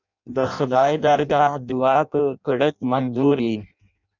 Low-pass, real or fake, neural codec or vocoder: 7.2 kHz; fake; codec, 16 kHz in and 24 kHz out, 0.6 kbps, FireRedTTS-2 codec